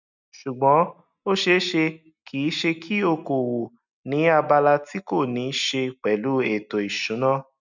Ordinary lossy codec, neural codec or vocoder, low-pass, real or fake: none; none; 7.2 kHz; real